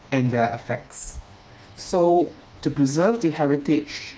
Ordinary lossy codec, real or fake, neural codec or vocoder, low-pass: none; fake; codec, 16 kHz, 2 kbps, FreqCodec, smaller model; none